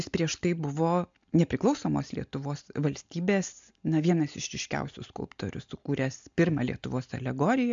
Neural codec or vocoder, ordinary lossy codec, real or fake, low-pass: none; MP3, 64 kbps; real; 7.2 kHz